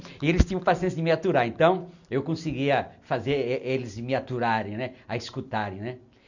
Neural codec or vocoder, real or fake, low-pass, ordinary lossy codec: none; real; 7.2 kHz; none